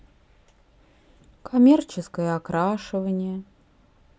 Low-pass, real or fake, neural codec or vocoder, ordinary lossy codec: none; real; none; none